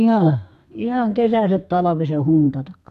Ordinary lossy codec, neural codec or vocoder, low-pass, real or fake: none; codec, 32 kHz, 1.9 kbps, SNAC; 14.4 kHz; fake